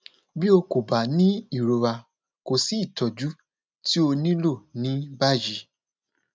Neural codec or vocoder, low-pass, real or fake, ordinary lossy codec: none; none; real; none